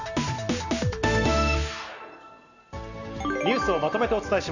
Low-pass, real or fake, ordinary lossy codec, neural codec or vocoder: 7.2 kHz; real; none; none